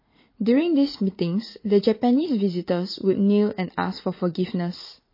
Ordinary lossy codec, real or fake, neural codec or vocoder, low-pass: MP3, 24 kbps; fake; vocoder, 44.1 kHz, 80 mel bands, Vocos; 5.4 kHz